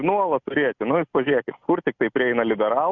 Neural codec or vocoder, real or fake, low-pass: none; real; 7.2 kHz